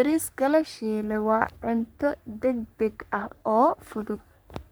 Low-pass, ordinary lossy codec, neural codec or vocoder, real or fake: none; none; codec, 44.1 kHz, 3.4 kbps, Pupu-Codec; fake